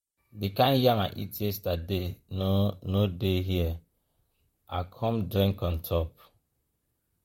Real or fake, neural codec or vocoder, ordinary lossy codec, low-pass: fake; vocoder, 44.1 kHz, 128 mel bands, Pupu-Vocoder; MP3, 64 kbps; 19.8 kHz